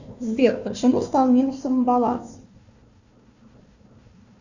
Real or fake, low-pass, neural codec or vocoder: fake; 7.2 kHz; codec, 16 kHz, 1 kbps, FunCodec, trained on Chinese and English, 50 frames a second